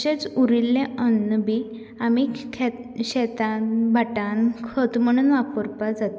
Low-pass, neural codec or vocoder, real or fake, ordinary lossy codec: none; none; real; none